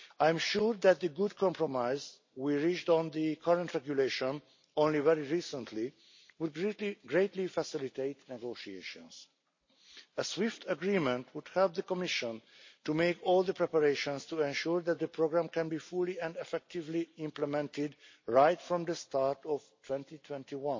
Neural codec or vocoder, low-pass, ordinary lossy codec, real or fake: none; 7.2 kHz; MP3, 32 kbps; real